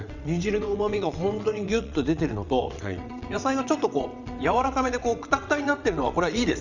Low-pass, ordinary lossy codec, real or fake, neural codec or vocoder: 7.2 kHz; none; fake; vocoder, 22.05 kHz, 80 mel bands, WaveNeXt